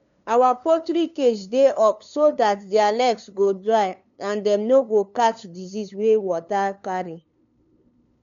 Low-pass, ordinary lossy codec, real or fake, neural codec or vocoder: 7.2 kHz; none; fake; codec, 16 kHz, 2 kbps, FunCodec, trained on LibriTTS, 25 frames a second